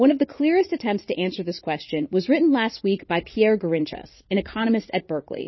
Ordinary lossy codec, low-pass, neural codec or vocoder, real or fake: MP3, 24 kbps; 7.2 kHz; vocoder, 44.1 kHz, 80 mel bands, Vocos; fake